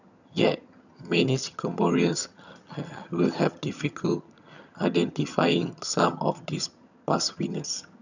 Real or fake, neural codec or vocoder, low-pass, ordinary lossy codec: fake; vocoder, 22.05 kHz, 80 mel bands, HiFi-GAN; 7.2 kHz; none